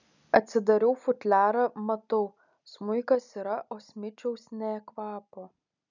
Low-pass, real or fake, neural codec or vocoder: 7.2 kHz; real; none